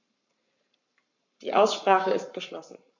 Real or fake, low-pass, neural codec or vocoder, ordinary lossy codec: fake; 7.2 kHz; codec, 44.1 kHz, 7.8 kbps, Pupu-Codec; none